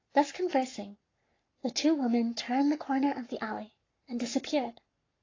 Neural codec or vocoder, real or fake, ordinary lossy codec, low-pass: codec, 44.1 kHz, 7.8 kbps, Pupu-Codec; fake; AAC, 32 kbps; 7.2 kHz